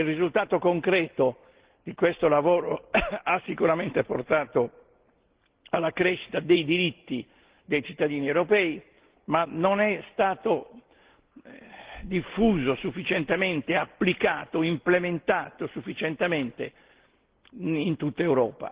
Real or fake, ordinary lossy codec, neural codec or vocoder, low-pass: real; Opus, 16 kbps; none; 3.6 kHz